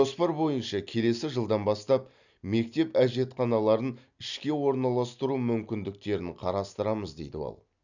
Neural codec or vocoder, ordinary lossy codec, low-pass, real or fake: none; none; 7.2 kHz; real